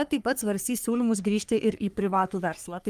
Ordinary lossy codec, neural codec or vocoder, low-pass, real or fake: Opus, 32 kbps; codec, 44.1 kHz, 3.4 kbps, Pupu-Codec; 14.4 kHz; fake